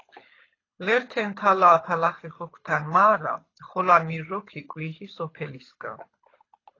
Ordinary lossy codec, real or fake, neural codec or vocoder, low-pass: AAC, 32 kbps; fake; codec, 24 kHz, 6 kbps, HILCodec; 7.2 kHz